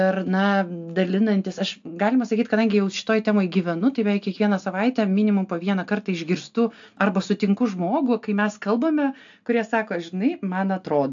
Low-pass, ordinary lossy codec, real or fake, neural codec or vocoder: 7.2 kHz; AAC, 64 kbps; real; none